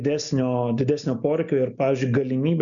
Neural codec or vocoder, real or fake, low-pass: none; real; 7.2 kHz